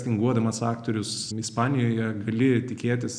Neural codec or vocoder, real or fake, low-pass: none; real; 9.9 kHz